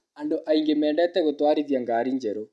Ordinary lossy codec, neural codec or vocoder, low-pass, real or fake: none; none; none; real